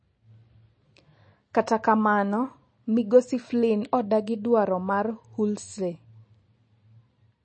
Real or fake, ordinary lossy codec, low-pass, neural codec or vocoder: real; MP3, 32 kbps; 9.9 kHz; none